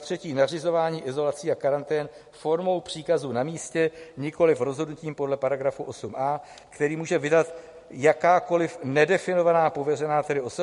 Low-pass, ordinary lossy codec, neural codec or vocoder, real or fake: 10.8 kHz; MP3, 48 kbps; none; real